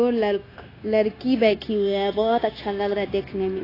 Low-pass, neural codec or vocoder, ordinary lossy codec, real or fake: 5.4 kHz; codec, 16 kHz, 0.9 kbps, LongCat-Audio-Codec; AAC, 24 kbps; fake